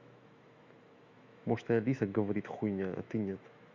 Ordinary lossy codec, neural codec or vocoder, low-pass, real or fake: Opus, 64 kbps; autoencoder, 48 kHz, 128 numbers a frame, DAC-VAE, trained on Japanese speech; 7.2 kHz; fake